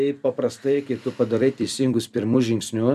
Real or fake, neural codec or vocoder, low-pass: fake; vocoder, 44.1 kHz, 128 mel bands every 256 samples, BigVGAN v2; 14.4 kHz